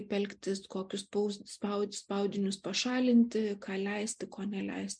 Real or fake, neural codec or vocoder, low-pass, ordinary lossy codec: real; none; 9.9 kHz; MP3, 64 kbps